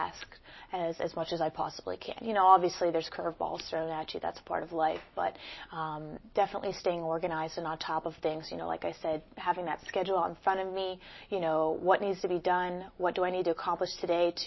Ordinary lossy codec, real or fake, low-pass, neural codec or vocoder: MP3, 24 kbps; real; 7.2 kHz; none